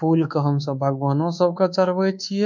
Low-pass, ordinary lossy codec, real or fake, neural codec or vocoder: 7.2 kHz; none; fake; codec, 24 kHz, 1.2 kbps, DualCodec